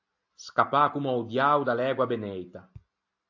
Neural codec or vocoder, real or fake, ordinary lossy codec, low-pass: none; real; AAC, 48 kbps; 7.2 kHz